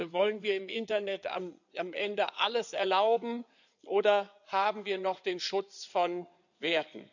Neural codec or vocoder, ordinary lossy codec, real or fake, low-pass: codec, 16 kHz in and 24 kHz out, 2.2 kbps, FireRedTTS-2 codec; none; fake; 7.2 kHz